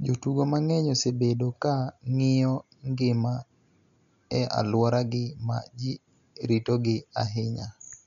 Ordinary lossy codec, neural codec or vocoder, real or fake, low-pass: none; none; real; 7.2 kHz